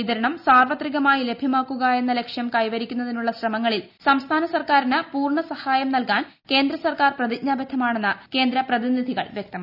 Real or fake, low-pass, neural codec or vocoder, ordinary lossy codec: real; 5.4 kHz; none; none